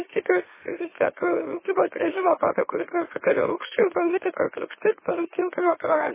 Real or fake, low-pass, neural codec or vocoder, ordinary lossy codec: fake; 3.6 kHz; autoencoder, 44.1 kHz, a latent of 192 numbers a frame, MeloTTS; MP3, 16 kbps